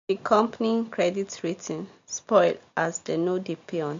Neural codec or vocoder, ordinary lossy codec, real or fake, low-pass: none; MP3, 48 kbps; real; 7.2 kHz